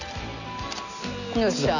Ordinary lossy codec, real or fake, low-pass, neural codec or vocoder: none; real; 7.2 kHz; none